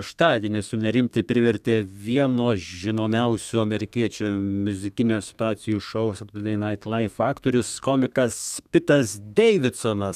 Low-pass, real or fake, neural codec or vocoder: 14.4 kHz; fake; codec, 32 kHz, 1.9 kbps, SNAC